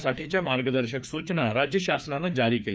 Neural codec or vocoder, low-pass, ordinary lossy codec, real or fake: codec, 16 kHz, 2 kbps, FreqCodec, larger model; none; none; fake